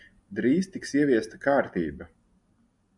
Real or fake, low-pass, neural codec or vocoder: real; 10.8 kHz; none